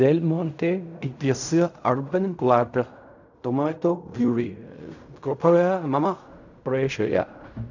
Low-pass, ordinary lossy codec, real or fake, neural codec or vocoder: 7.2 kHz; none; fake; codec, 16 kHz in and 24 kHz out, 0.4 kbps, LongCat-Audio-Codec, fine tuned four codebook decoder